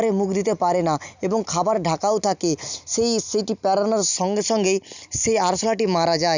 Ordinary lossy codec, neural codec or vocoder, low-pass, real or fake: none; none; 7.2 kHz; real